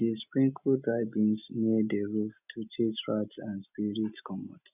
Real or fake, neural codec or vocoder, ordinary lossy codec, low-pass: real; none; none; 3.6 kHz